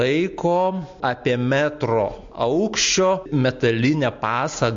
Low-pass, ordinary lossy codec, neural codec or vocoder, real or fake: 7.2 kHz; MP3, 48 kbps; none; real